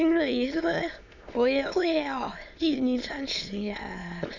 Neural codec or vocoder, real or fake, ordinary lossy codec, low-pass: autoencoder, 22.05 kHz, a latent of 192 numbers a frame, VITS, trained on many speakers; fake; none; 7.2 kHz